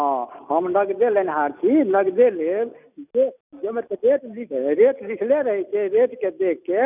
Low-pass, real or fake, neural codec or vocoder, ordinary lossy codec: 3.6 kHz; real; none; none